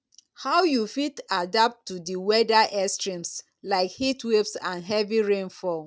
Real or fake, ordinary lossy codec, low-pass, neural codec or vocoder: real; none; none; none